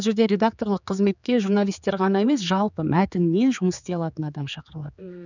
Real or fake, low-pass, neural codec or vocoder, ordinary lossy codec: fake; 7.2 kHz; codec, 16 kHz, 4 kbps, X-Codec, HuBERT features, trained on general audio; none